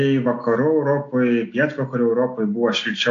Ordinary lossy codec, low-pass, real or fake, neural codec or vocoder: AAC, 48 kbps; 7.2 kHz; real; none